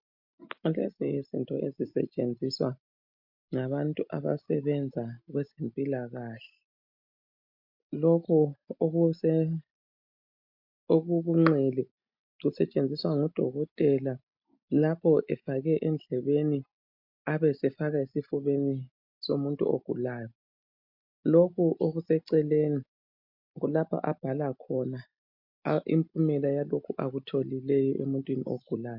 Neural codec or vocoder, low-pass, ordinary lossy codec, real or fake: none; 5.4 kHz; MP3, 48 kbps; real